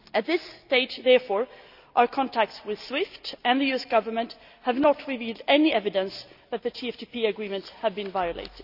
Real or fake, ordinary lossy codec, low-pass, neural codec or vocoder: real; none; 5.4 kHz; none